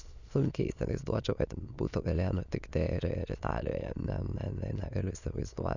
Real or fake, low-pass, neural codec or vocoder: fake; 7.2 kHz; autoencoder, 22.05 kHz, a latent of 192 numbers a frame, VITS, trained on many speakers